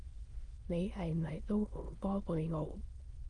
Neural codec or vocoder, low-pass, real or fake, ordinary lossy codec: autoencoder, 22.05 kHz, a latent of 192 numbers a frame, VITS, trained on many speakers; 9.9 kHz; fake; Opus, 24 kbps